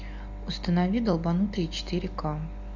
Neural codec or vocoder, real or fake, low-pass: none; real; 7.2 kHz